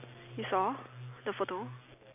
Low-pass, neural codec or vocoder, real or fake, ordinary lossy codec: 3.6 kHz; none; real; none